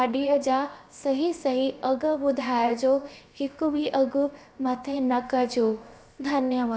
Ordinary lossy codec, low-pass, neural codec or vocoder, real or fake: none; none; codec, 16 kHz, 0.7 kbps, FocalCodec; fake